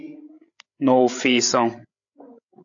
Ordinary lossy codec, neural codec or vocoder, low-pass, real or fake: AAC, 64 kbps; codec, 16 kHz, 16 kbps, FreqCodec, larger model; 7.2 kHz; fake